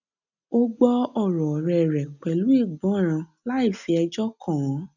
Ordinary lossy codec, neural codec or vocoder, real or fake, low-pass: none; none; real; 7.2 kHz